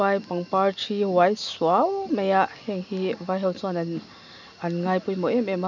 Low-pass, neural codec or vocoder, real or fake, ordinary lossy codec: 7.2 kHz; none; real; none